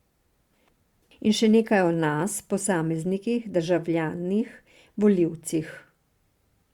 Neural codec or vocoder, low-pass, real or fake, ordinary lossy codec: vocoder, 44.1 kHz, 128 mel bands every 512 samples, BigVGAN v2; 19.8 kHz; fake; Opus, 64 kbps